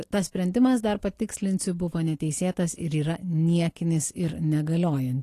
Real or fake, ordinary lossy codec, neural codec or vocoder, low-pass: real; AAC, 48 kbps; none; 14.4 kHz